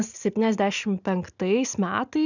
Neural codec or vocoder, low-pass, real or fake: none; 7.2 kHz; real